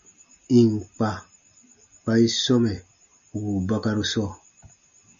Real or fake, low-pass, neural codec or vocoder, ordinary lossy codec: real; 7.2 kHz; none; MP3, 48 kbps